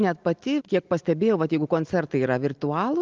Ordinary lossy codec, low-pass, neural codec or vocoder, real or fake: Opus, 24 kbps; 7.2 kHz; none; real